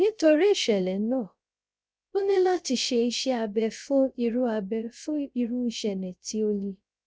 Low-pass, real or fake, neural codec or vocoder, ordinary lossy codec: none; fake; codec, 16 kHz, 0.3 kbps, FocalCodec; none